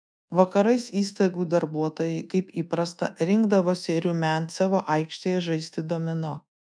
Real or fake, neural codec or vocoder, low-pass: fake; codec, 24 kHz, 1.2 kbps, DualCodec; 9.9 kHz